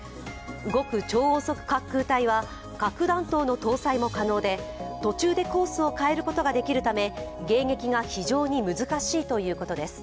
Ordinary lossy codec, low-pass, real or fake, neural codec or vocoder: none; none; real; none